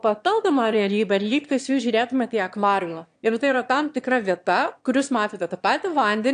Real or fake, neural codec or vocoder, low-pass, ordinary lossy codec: fake; autoencoder, 22.05 kHz, a latent of 192 numbers a frame, VITS, trained on one speaker; 9.9 kHz; AAC, 64 kbps